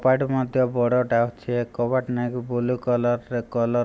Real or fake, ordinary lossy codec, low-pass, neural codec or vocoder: real; none; none; none